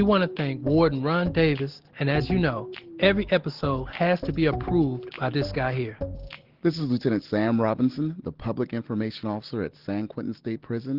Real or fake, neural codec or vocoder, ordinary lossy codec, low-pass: real; none; Opus, 16 kbps; 5.4 kHz